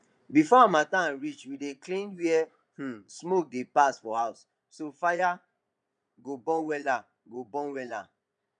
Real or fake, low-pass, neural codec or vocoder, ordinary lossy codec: fake; 9.9 kHz; vocoder, 22.05 kHz, 80 mel bands, Vocos; none